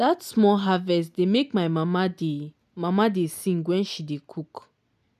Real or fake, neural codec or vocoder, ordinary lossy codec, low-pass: real; none; none; 14.4 kHz